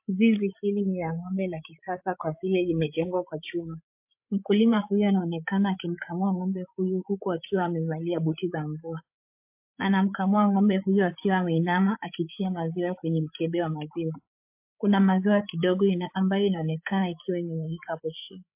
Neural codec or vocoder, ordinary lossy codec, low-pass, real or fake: codec, 16 kHz, 8 kbps, FreqCodec, larger model; MP3, 32 kbps; 3.6 kHz; fake